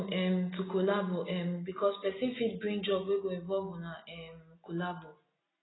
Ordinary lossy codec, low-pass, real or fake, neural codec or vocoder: AAC, 16 kbps; 7.2 kHz; real; none